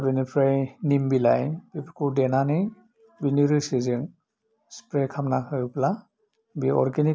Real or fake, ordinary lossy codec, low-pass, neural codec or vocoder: real; none; none; none